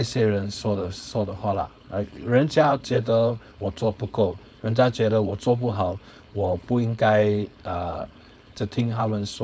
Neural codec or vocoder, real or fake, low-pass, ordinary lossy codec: codec, 16 kHz, 4.8 kbps, FACodec; fake; none; none